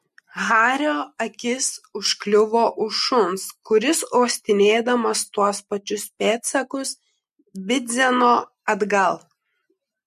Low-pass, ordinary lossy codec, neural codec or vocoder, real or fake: 14.4 kHz; MP3, 64 kbps; none; real